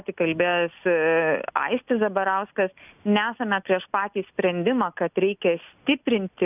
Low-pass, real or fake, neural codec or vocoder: 3.6 kHz; real; none